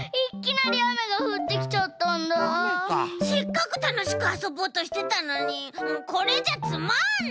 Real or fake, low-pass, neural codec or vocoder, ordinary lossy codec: real; none; none; none